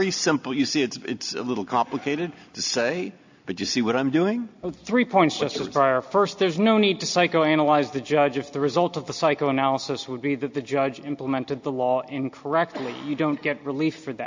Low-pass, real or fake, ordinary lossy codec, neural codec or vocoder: 7.2 kHz; real; AAC, 48 kbps; none